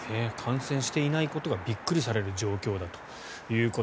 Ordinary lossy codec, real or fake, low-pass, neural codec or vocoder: none; real; none; none